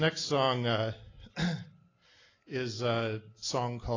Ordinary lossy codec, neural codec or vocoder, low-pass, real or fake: AAC, 32 kbps; none; 7.2 kHz; real